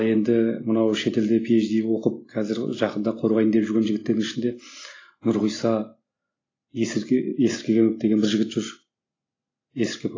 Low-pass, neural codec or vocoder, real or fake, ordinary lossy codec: 7.2 kHz; none; real; AAC, 32 kbps